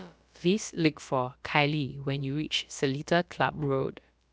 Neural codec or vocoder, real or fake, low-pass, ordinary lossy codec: codec, 16 kHz, about 1 kbps, DyCAST, with the encoder's durations; fake; none; none